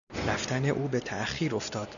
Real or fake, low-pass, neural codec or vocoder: real; 7.2 kHz; none